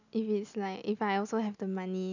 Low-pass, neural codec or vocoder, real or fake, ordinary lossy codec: 7.2 kHz; none; real; none